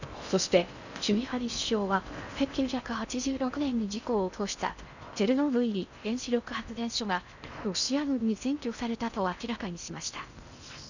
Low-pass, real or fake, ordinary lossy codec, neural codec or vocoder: 7.2 kHz; fake; none; codec, 16 kHz in and 24 kHz out, 0.6 kbps, FocalCodec, streaming, 4096 codes